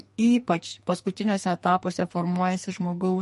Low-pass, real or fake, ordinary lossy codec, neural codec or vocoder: 14.4 kHz; fake; MP3, 48 kbps; codec, 44.1 kHz, 2.6 kbps, SNAC